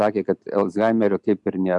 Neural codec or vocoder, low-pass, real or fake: none; 10.8 kHz; real